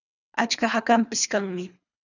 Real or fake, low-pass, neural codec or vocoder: fake; 7.2 kHz; codec, 24 kHz, 3 kbps, HILCodec